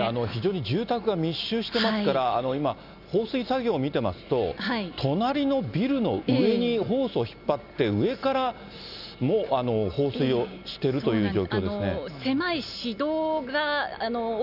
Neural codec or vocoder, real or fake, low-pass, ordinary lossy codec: none; real; 5.4 kHz; none